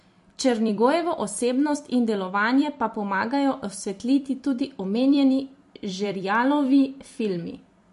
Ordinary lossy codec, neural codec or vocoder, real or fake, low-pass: MP3, 48 kbps; vocoder, 44.1 kHz, 128 mel bands every 256 samples, BigVGAN v2; fake; 14.4 kHz